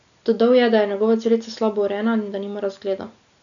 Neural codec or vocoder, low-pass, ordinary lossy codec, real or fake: none; 7.2 kHz; none; real